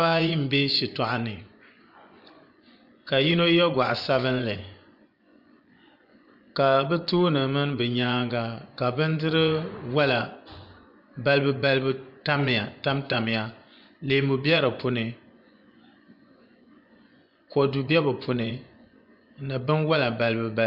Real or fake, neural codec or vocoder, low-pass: fake; vocoder, 24 kHz, 100 mel bands, Vocos; 5.4 kHz